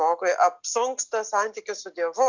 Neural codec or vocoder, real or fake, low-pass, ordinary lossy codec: none; real; 7.2 kHz; Opus, 64 kbps